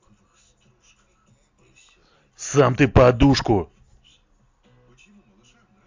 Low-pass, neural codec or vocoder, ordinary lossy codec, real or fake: 7.2 kHz; none; MP3, 64 kbps; real